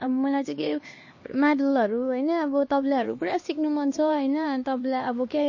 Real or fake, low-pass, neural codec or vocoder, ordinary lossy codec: fake; 7.2 kHz; codec, 16 kHz, 2 kbps, X-Codec, HuBERT features, trained on LibriSpeech; MP3, 32 kbps